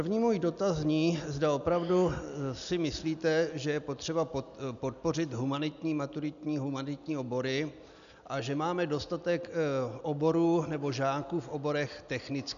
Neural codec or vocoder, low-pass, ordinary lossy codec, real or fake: none; 7.2 kHz; MP3, 96 kbps; real